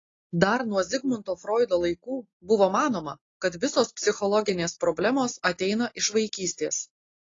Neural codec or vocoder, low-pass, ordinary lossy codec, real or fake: none; 7.2 kHz; AAC, 32 kbps; real